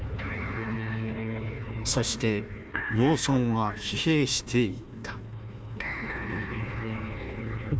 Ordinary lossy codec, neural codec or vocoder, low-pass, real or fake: none; codec, 16 kHz, 1 kbps, FunCodec, trained on Chinese and English, 50 frames a second; none; fake